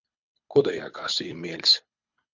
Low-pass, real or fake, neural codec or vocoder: 7.2 kHz; fake; codec, 24 kHz, 6 kbps, HILCodec